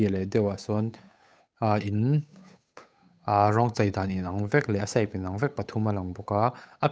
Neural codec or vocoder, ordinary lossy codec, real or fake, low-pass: codec, 16 kHz, 8 kbps, FunCodec, trained on Chinese and English, 25 frames a second; none; fake; none